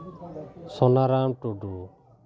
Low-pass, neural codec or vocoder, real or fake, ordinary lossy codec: none; none; real; none